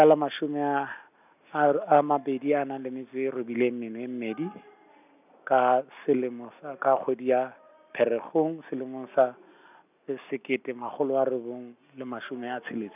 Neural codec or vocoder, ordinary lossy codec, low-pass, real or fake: none; none; 3.6 kHz; real